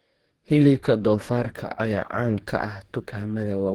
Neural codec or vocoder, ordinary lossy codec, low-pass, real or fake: codec, 44.1 kHz, 2.6 kbps, DAC; Opus, 16 kbps; 19.8 kHz; fake